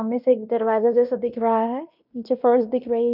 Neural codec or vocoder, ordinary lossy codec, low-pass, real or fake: codec, 16 kHz in and 24 kHz out, 0.9 kbps, LongCat-Audio-Codec, fine tuned four codebook decoder; none; 5.4 kHz; fake